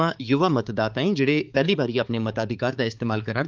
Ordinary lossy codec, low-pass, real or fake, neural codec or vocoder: Opus, 24 kbps; 7.2 kHz; fake; codec, 16 kHz, 4 kbps, X-Codec, HuBERT features, trained on balanced general audio